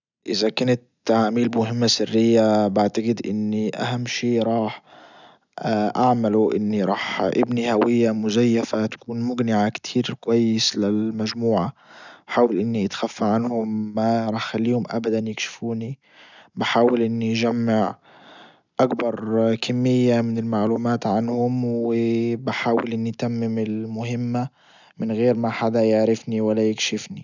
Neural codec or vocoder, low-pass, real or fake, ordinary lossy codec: none; 7.2 kHz; real; none